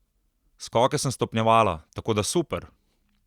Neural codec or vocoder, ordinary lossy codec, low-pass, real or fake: none; Opus, 64 kbps; 19.8 kHz; real